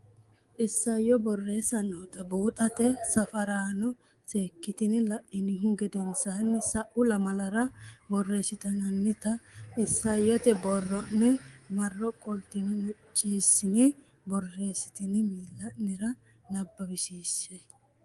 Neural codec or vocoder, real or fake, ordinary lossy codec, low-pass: codec, 24 kHz, 3.1 kbps, DualCodec; fake; Opus, 24 kbps; 10.8 kHz